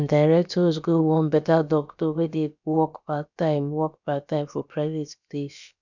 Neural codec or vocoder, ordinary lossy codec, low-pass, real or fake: codec, 16 kHz, about 1 kbps, DyCAST, with the encoder's durations; none; 7.2 kHz; fake